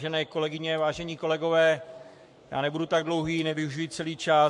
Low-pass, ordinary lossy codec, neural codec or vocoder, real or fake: 10.8 kHz; MP3, 64 kbps; codec, 44.1 kHz, 7.8 kbps, Pupu-Codec; fake